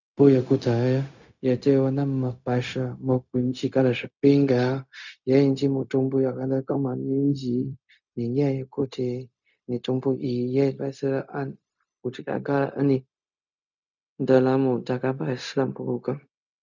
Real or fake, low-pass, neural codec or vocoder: fake; 7.2 kHz; codec, 16 kHz, 0.4 kbps, LongCat-Audio-Codec